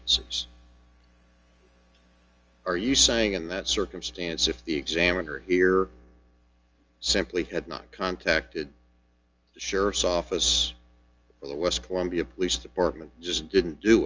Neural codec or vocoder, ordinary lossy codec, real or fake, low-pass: none; Opus, 24 kbps; real; 7.2 kHz